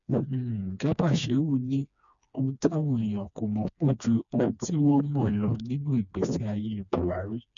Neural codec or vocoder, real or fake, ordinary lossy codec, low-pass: codec, 16 kHz, 2 kbps, FreqCodec, smaller model; fake; none; 7.2 kHz